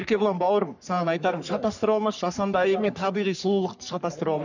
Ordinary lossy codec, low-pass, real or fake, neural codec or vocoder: none; 7.2 kHz; fake; codec, 44.1 kHz, 3.4 kbps, Pupu-Codec